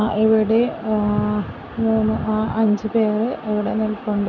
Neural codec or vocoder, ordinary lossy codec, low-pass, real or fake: none; none; 7.2 kHz; real